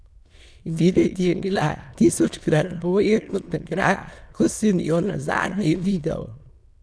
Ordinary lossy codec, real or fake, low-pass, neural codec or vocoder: none; fake; none; autoencoder, 22.05 kHz, a latent of 192 numbers a frame, VITS, trained on many speakers